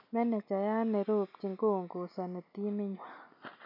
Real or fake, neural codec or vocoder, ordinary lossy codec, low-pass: real; none; none; 5.4 kHz